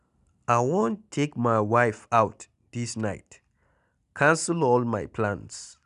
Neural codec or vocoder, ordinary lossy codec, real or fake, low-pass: none; none; real; 10.8 kHz